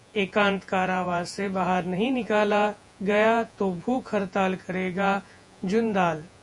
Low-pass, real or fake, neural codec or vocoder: 10.8 kHz; fake; vocoder, 48 kHz, 128 mel bands, Vocos